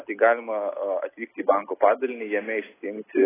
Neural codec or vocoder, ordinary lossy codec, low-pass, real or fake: none; AAC, 16 kbps; 3.6 kHz; real